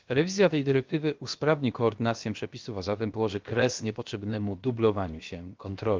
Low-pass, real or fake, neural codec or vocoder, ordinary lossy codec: 7.2 kHz; fake; codec, 16 kHz, about 1 kbps, DyCAST, with the encoder's durations; Opus, 32 kbps